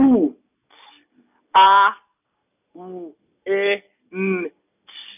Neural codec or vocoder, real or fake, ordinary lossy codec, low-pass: none; real; none; 3.6 kHz